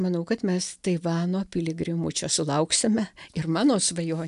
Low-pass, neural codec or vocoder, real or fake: 10.8 kHz; none; real